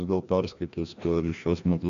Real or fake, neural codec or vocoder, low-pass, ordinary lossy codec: fake; codec, 16 kHz, 1 kbps, FreqCodec, larger model; 7.2 kHz; MP3, 64 kbps